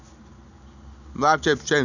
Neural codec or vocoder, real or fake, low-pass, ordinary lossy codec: none; real; 7.2 kHz; none